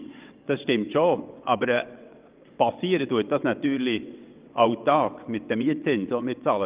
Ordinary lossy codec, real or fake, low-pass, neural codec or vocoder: Opus, 24 kbps; fake; 3.6 kHz; vocoder, 22.05 kHz, 80 mel bands, WaveNeXt